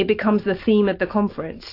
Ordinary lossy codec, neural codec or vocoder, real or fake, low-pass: AAC, 32 kbps; none; real; 5.4 kHz